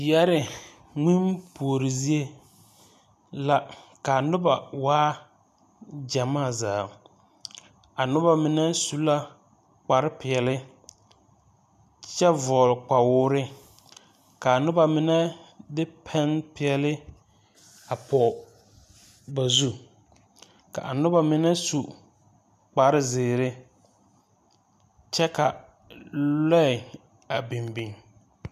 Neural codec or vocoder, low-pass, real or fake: none; 14.4 kHz; real